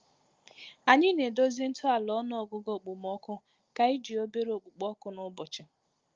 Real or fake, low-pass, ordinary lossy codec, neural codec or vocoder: real; 7.2 kHz; Opus, 32 kbps; none